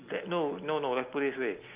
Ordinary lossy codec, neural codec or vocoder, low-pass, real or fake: Opus, 32 kbps; none; 3.6 kHz; real